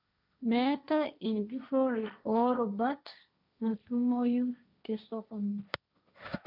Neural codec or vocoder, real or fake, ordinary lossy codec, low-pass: codec, 16 kHz, 1.1 kbps, Voila-Tokenizer; fake; none; 5.4 kHz